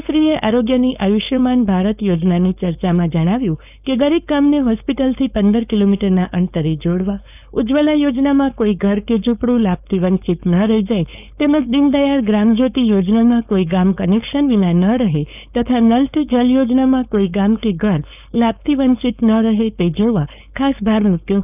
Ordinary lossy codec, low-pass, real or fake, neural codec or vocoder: none; 3.6 kHz; fake; codec, 16 kHz, 4.8 kbps, FACodec